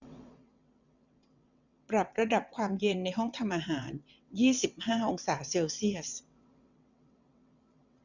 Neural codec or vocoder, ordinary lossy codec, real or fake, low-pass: vocoder, 22.05 kHz, 80 mel bands, WaveNeXt; none; fake; 7.2 kHz